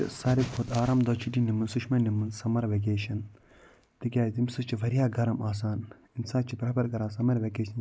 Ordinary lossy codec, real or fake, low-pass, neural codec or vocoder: none; real; none; none